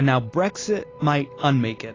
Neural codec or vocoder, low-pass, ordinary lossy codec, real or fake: none; 7.2 kHz; AAC, 32 kbps; real